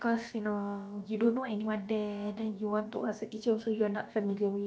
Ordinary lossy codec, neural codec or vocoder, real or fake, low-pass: none; codec, 16 kHz, about 1 kbps, DyCAST, with the encoder's durations; fake; none